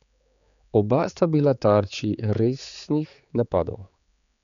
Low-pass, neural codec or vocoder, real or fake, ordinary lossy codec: 7.2 kHz; codec, 16 kHz, 4 kbps, X-Codec, HuBERT features, trained on general audio; fake; none